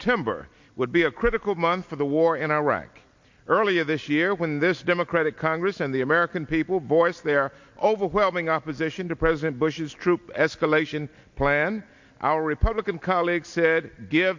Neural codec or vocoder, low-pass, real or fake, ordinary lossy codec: none; 7.2 kHz; real; MP3, 48 kbps